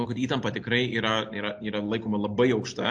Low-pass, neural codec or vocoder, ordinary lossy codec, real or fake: 9.9 kHz; none; MP3, 48 kbps; real